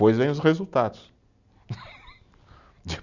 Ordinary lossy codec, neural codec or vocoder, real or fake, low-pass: none; none; real; 7.2 kHz